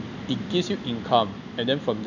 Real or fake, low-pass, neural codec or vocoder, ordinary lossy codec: real; 7.2 kHz; none; none